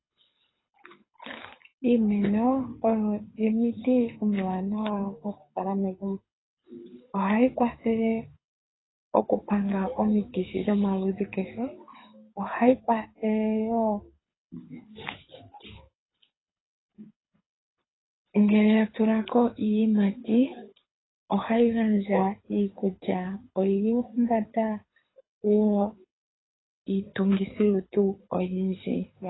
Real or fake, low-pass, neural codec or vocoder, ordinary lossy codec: fake; 7.2 kHz; codec, 24 kHz, 6 kbps, HILCodec; AAC, 16 kbps